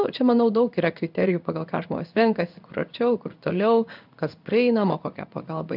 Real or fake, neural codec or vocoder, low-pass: fake; codec, 16 kHz in and 24 kHz out, 1 kbps, XY-Tokenizer; 5.4 kHz